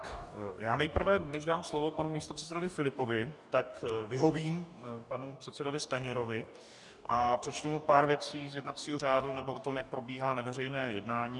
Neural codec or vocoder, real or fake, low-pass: codec, 44.1 kHz, 2.6 kbps, DAC; fake; 10.8 kHz